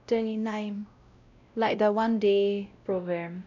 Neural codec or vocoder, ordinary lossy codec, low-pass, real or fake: codec, 16 kHz, 0.5 kbps, X-Codec, WavLM features, trained on Multilingual LibriSpeech; none; 7.2 kHz; fake